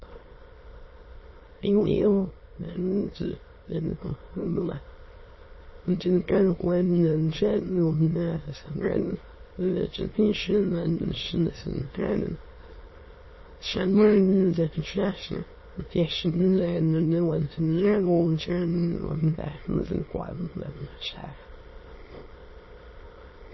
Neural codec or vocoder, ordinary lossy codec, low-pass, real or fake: autoencoder, 22.05 kHz, a latent of 192 numbers a frame, VITS, trained on many speakers; MP3, 24 kbps; 7.2 kHz; fake